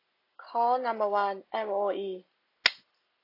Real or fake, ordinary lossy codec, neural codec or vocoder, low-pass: real; AAC, 32 kbps; none; 5.4 kHz